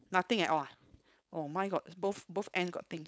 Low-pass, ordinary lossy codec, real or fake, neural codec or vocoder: none; none; fake; codec, 16 kHz, 4.8 kbps, FACodec